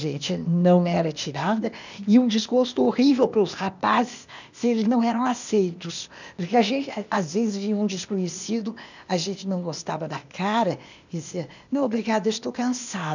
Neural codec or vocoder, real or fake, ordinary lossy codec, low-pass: codec, 16 kHz, 0.8 kbps, ZipCodec; fake; none; 7.2 kHz